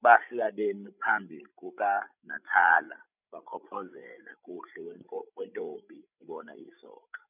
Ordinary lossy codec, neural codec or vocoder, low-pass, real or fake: none; codec, 16 kHz, 16 kbps, FreqCodec, larger model; 3.6 kHz; fake